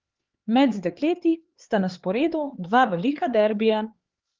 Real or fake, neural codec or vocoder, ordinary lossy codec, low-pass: fake; codec, 16 kHz, 4 kbps, X-Codec, HuBERT features, trained on LibriSpeech; Opus, 16 kbps; 7.2 kHz